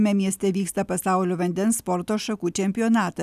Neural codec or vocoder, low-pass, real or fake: none; 14.4 kHz; real